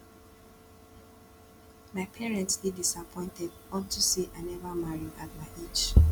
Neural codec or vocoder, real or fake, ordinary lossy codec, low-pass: none; real; none; 19.8 kHz